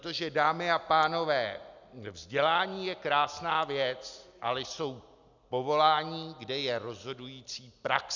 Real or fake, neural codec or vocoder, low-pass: real; none; 7.2 kHz